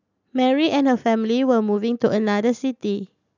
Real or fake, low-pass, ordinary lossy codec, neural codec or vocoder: real; 7.2 kHz; none; none